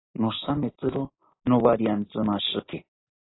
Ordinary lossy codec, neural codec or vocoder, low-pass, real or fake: AAC, 16 kbps; none; 7.2 kHz; real